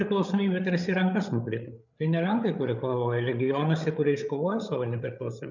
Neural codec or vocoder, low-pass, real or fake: codec, 16 kHz, 8 kbps, FreqCodec, larger model; 7.2 kHz; fake